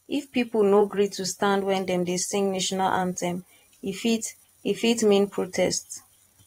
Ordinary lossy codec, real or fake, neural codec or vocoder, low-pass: AAC, 48 kbps; fake; vocoder, 44.1 kHz, 128 mel bands every 512 samples, BigVGAN v2; 19.8 kHz